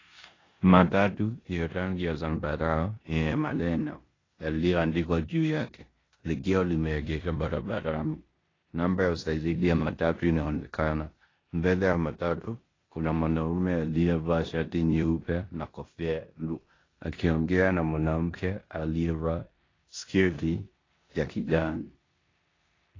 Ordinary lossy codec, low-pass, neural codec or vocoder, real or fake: AAC, 32 kbps; 7.2 kHz; codec, 16 kHz in and 24 kHz out, 0.9 kbps, LongCat-Audio-Codec, four codebook decoder; fake